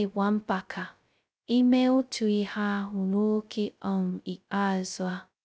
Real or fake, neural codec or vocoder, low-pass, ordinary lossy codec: fake; codec, 16 kHz, 0.2 kbps, FocalCodec; none; none